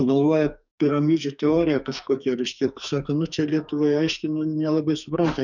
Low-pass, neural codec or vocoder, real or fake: 7.2 kHz; codec, 44.1 kHz, 2.6 kbps, SNAC; fake